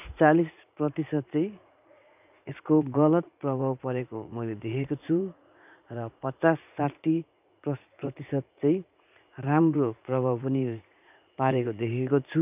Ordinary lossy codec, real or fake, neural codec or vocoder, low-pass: none; fake; vocoder, 44.1 kHz, 80 mel bands, Vocos; 3.6 kHz